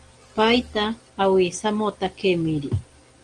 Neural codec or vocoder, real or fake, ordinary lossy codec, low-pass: none; real; Opus, 24 kbps; 9.9 kHz